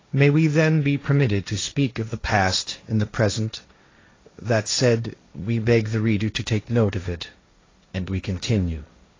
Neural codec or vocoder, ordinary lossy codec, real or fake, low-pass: codec, 16 kHz, 1.1 kbps, Voila-Tokenizer; AAC, 32 kbps; fake; 7.2 kHz